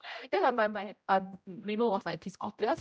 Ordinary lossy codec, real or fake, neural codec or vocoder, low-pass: none; fake; codec, 16 kHz, 0.5 kbps, X-Codec, HuBERT features, trained on general audio; none